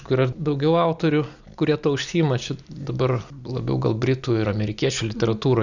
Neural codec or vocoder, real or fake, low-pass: none; real; 7.2 kHz